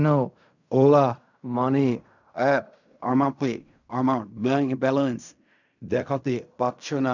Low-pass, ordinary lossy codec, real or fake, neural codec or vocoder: 7.2 kHz; none; fake; codec, 16 kHz in and 24 kHz out, 0.4 kbps, LongCat-Audio-Codec, fine tuned four codebook decoder